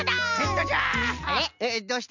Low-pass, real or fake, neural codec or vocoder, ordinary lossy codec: 7.2 kHz; real; none; none